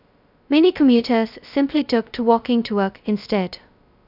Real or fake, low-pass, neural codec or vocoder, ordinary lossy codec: fake; 5.4 kHz; codec, 16 kHz, 0.2 kbps, FocalCodec; none